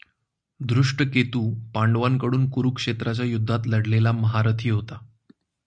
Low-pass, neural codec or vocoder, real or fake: 9.9 kHz; none; real